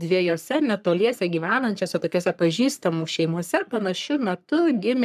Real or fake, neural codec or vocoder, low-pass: fake; codec, 44.1 kHz, 3.4 kbps, Pupu-Codec; 14.4 kHz